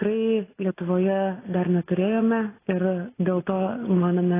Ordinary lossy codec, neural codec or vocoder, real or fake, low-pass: AAC, 16 kbps; none; real; 3.6 kHz